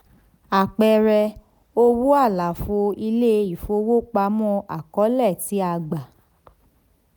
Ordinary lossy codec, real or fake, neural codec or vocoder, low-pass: none; real; none; none